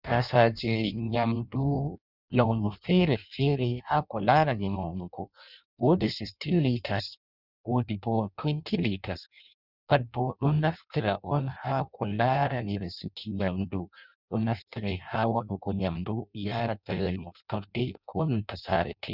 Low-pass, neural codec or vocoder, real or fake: 5.4 kHz; codec, 16 kHz in and 24 kHz out, 0.6 kbps, FireRedTTS-2 codec; fake